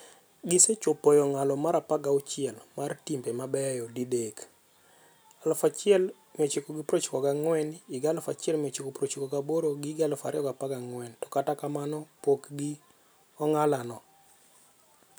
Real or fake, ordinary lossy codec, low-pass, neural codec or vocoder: real; none; none; none